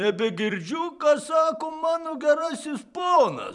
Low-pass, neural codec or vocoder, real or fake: 10.8 kHz; vocoder, 44.1 kHz, 128 mel bands every 256 samples, BigVGAN v2; fake